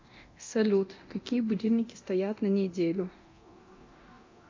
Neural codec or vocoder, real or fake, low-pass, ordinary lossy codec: codec, 24 kHz, 0.9 kbps, DualCodec; fake; 7.2 kHz; MP3, 48 kbps